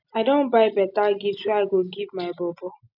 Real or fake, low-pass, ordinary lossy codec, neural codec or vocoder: real; 5.4 kHz; none; none